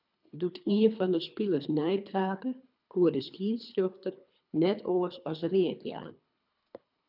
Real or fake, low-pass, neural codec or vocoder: fake; 5.4 kHz; codec, 24 kHz, 3 kbps, HILCodec